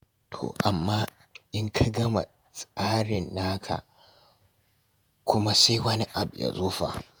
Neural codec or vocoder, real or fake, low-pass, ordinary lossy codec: vocoder, 48 kHz, 128 mel bands, Vocos; fake; none; none